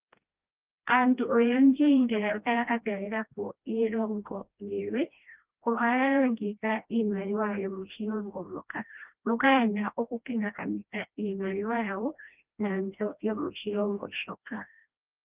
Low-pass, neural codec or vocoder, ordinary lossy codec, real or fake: 3.6 kHz; codec, 16 kHz, 1 kbps, FreqCodec, smaller model; Opus, 24 kbps; fake